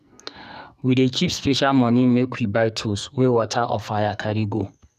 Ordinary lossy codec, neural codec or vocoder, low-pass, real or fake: none; codec, 44.1 kHz, 2.6 kbps, SNAC; 14.4 kHz; fake